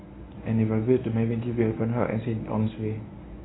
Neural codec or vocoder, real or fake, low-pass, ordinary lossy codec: codec, 24 kHz, 0.9 kbps, WavTokenizer, medium speech release version 1; fake; 7.2 kHz; AAC, 16 kbps